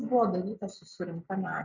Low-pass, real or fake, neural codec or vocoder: 7.2 kHz; real; none